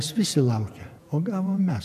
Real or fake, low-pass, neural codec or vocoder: fake; 14.4 kHz; vocoder, 48 kHz, 128 mel bands, Vocos